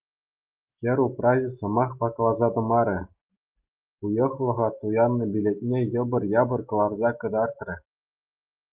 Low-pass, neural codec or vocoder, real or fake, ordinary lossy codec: 3.6 kHz; none; real; Opus, 32 kbps